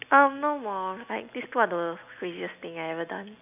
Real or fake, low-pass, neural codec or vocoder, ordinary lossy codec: real; 3.6 kHz; none; none